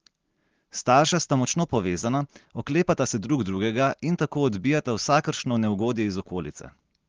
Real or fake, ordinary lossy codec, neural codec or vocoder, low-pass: real; Opus, 16 kbps; none; 7.2 kHz